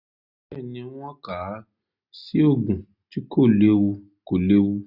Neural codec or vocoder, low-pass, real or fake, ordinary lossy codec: none; 5.4 kHz; real; none